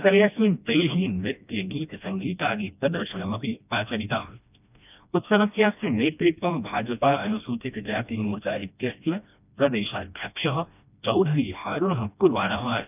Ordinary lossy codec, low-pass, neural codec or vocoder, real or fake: none; 3.6 kHz; codec, 16 kHz, 1 kbps, FreqCodec, smaller model; fake